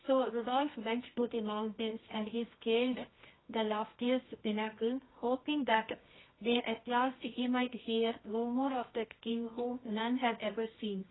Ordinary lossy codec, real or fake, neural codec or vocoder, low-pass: AAC, 16 kbps; fake; codec, 24 kHz, 0.9 kbps, WavTokenizer, medium music audio release; 7.2 kHz